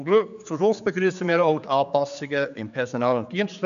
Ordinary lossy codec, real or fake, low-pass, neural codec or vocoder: none; fake; 7.2 kHz; codec, 16 kHz, 4 kbps, X-Codec, HuBERT features, trained on general audio